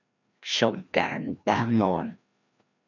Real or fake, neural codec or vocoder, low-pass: fake; codec, 16 kHz, 1 kbps, FreqCodec, larger model; 7.2 kHz